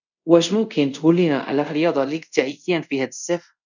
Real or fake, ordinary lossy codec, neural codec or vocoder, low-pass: fake; none; codec, 24 kHz, 0.5 kbps, DualCodec; 7.2 kHz